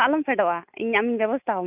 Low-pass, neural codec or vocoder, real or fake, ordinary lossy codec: 3.6 kHz; none; real; none